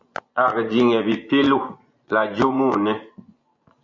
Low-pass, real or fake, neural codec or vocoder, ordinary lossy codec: 7.2 kHz; fake; vocoder, 24 kHz, 100 mel bands, Vocos; MP3, 48 kbps